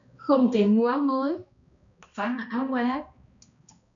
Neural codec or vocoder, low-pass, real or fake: codec, 16 kHz, 1 kbps, X-Codec, HuBERT features, trained on balanced general audio; 7.2 kHz; fake